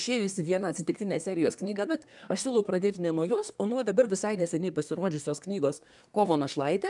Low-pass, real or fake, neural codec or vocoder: 10.8 kHz; fake; codec, 24 kHz, 1 kbps, SNAC